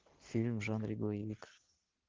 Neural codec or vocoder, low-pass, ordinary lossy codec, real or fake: none; 7.2 kHz; Opus, 16 kbps; real